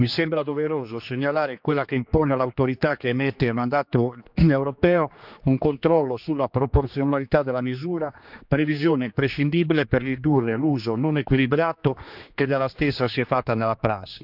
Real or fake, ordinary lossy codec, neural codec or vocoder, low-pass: fake; none; codec, 16 kHz, 2 kbps, X-Codec, HuBERT features, trained on general audio; 5.4 kHz